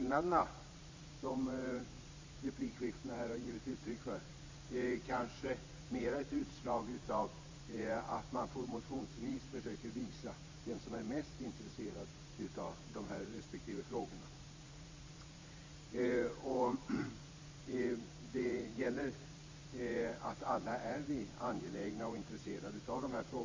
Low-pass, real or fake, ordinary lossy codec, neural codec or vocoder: 7.2 kHz; fake; MP3, 64 kbps; vocoder, 44.1 kHz, 80 mel bands, Vocos